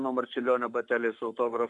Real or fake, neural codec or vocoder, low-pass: fake; codec, 44.1 kHz, 7.8 kbps, Pupu-Codec; 10.8 kHz